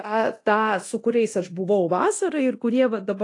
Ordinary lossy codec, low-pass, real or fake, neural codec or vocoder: AAC, 48 kbps; 10.8 kHz; fake; codec, 24 kHz, 0.9 kbps, DualCodec